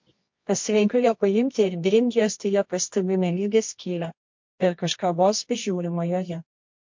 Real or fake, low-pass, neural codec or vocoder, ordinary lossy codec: fake; 7.2 kHz; codec, 24 kHz, 0.9 kbps, WavTokenizer, medium music audio release; MP3, 48 kbps